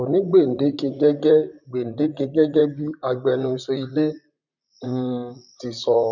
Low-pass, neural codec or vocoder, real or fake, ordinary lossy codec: 7.2 kHz; vocoder, 44.1 kHz, 128 mel bands, Pupu-Vocoder; fake; none